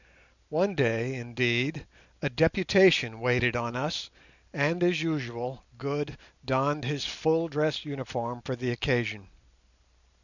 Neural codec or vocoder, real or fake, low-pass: none; real; 7.2 kHz